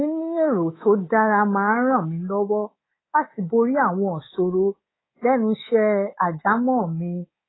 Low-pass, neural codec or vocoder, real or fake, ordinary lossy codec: 7.2 kHz; codec, 24 kHz, 3.1 kbps, DualCodec; fake; AAC, 16 kbps